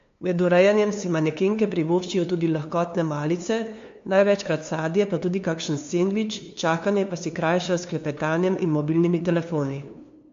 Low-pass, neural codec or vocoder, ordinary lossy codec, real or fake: 7.2 kHz; codec, 16 kHz, 2 kbps, FunCodec, trained on LibriTTS, 25 frames a second; MP3, 48 kbps; fake